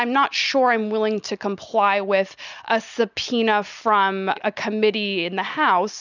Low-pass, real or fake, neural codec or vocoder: 7.2 kHz; real; none